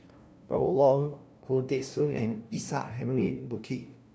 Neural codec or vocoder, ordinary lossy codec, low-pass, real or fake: codec, 16 kHz, 0.5 kbps, FunCodec, trained on LibriTTS, 25 frames a second; none; none; fake